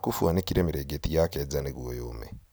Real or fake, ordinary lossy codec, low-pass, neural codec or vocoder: real; none; none; none